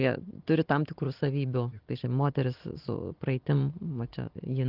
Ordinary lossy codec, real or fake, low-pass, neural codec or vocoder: Opus, 16 kbps; real; 5.4 kHz; none